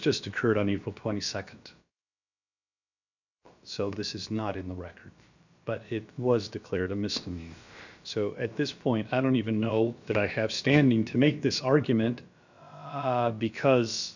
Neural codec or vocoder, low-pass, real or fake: codec, 16 kHz, about 1 kbps, DyCAST, with the encoder's durations; 7.2 kHz; fake